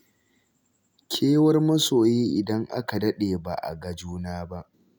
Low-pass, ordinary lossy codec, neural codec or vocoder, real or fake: none; none; none; real